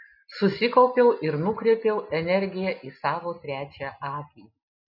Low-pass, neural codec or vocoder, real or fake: 5.4 kHz; none; real